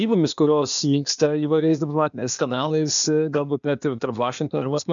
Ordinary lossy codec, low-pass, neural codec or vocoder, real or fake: MP3, 96 kbps; 7.2 kHz; codec, 16 kHz, 0.8 kbps, ZipCodec; fake